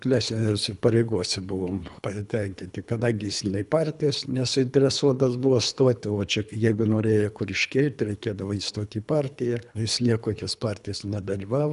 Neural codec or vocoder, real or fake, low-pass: codec, 24 kHz, 3 kbps, HILCodec; fake; 10.8 kHz